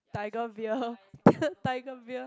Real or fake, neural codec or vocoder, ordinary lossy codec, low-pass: real; none; none; none